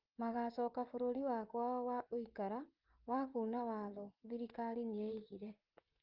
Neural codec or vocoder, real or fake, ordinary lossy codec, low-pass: vocoder, 44.1 kHz, 128 mel bands, Pupu-Vocoder; fake; Opus, 24 kbps; 5.4 kHz